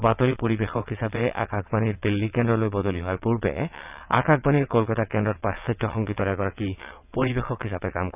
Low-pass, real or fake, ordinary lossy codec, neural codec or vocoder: 3.6 kHz; fake; none; vocoder, 22.05 kHz, 80 mel bands, WaveNeXt